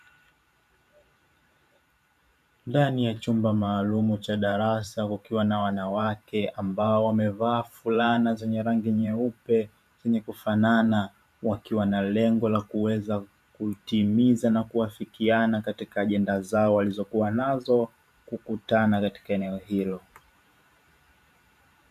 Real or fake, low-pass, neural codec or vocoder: fake; 14.4 kHz; vocoder, 44.1 kHz, 128 mel bands every 512 samples, BigVGAN v2